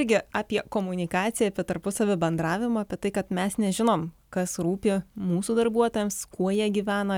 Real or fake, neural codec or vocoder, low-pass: real; none; 19.8 kHz